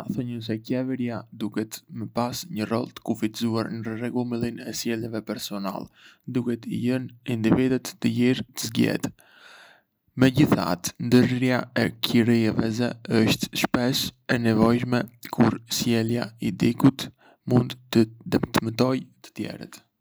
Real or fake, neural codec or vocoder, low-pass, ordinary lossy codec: real; none; none; none